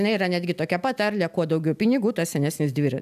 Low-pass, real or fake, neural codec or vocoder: 14.4 kHz; real; none